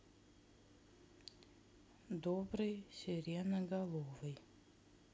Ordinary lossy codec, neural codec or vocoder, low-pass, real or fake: none; none; none; real